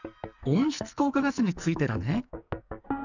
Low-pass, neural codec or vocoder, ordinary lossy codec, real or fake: 7.2 kHz; codec, 44.1 kHz, 2.6 kbps, SNAC; none; fake